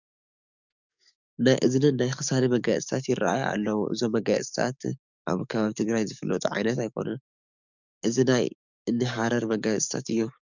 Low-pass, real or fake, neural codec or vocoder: 7.2 kHz; fake; codec, 44.1 kHz, 7.8 kbps, DAC